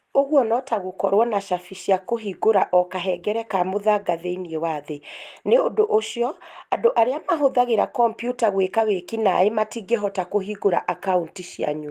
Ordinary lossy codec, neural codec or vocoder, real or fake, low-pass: Opus, 24 kbps; none; real; 14.4 kHz